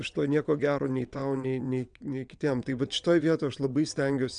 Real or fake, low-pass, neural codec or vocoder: fake; 9.9 kHz; vocoder, 22.05 kHz, 80 mel bands, WaveNeXt